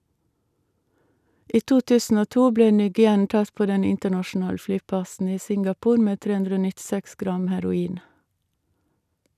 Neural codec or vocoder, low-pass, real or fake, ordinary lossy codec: none; 14.4 kHz; real; none